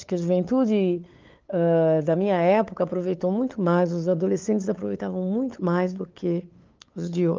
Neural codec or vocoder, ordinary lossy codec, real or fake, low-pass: codec, 16 kHz, 8 kbps, FunCodec, trained on LibriTTS, 25 frames a second; Opus, 32 kbps; fake; 7.2 kHz